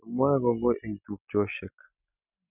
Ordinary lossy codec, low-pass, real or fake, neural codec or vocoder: none; 3.6 kHz; real; none